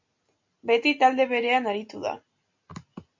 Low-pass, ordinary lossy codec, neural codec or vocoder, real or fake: 7.2 kHz; MP3, 48 kbps; none; real